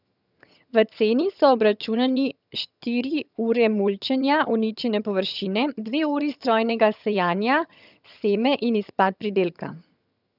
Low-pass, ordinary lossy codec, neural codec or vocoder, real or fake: 5.4 kHz; none; vocoder, 22.05 kHz, 80 mel bands, HiFi-GAN; fake